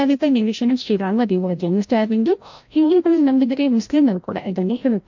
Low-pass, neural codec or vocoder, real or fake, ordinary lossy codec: 7.2 kHz; codec, 16 kHz, 0.5 kbps, FreqCodec, larger model; fake; MP3, 48 kbps